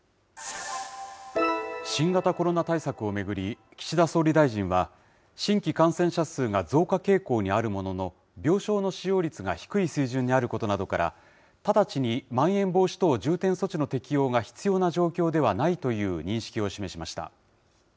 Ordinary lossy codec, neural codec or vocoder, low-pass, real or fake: none; none; none; real